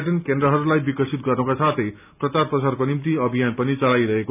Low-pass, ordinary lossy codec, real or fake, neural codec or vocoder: 3.6 kHz; none; real; none